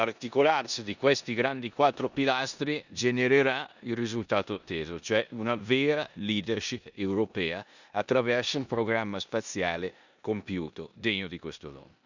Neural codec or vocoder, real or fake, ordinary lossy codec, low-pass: codec, 16 kHz in and 24 kHz out, 0.9 kbps, LongCat-Audio-Codec, four codebook decoder; fake; none; 7.2 kHz